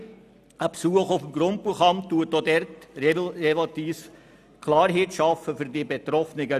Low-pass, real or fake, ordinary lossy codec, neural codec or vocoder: 14.4 kHz; real; none; none